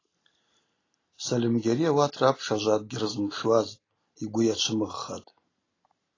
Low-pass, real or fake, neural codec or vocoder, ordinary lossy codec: 7.2 kHz; real; none; AAC, 32 kbps